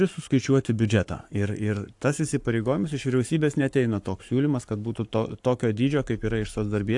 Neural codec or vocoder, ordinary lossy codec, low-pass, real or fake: codec, 44.1 kHz, 7.8 kbps, Pupu-Codec; AAC, 64 kbps; 10.8 kHz; fake